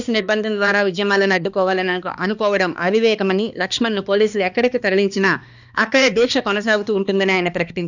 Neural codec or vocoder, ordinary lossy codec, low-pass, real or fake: codec, 16 kHz, 2 kbps, X-Codec, HuBERT features, trained on balanced general audio; none; 7.2 kHz; fake